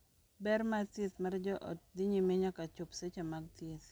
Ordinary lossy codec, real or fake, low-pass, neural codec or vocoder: none; real; none; none